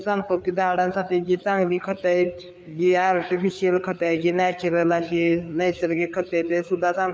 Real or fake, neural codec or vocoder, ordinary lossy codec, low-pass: fake; codec, 16 kHz, 4 kbps, FreqCodec, larger model; none; none